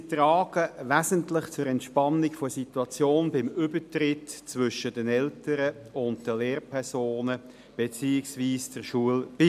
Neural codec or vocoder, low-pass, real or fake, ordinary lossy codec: none; 14.4 kHz; real; MP3, 96 kbps